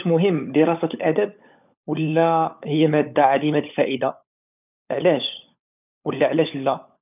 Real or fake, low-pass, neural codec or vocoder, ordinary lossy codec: fake; 3.6 kHz; codec, 16 kHz, 16 kbps, FunCodec, trained on LibriTTS, 50 frames a second; none